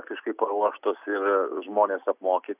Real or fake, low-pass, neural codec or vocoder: real; 3.6 kHz; none